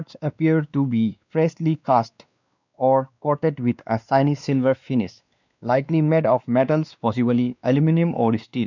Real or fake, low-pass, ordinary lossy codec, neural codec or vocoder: fake; 7.2 kHz; none; codec, 16 kHz, 2 kbps, X-Codec, WavLM features, trained on Multilingual LibriSpeech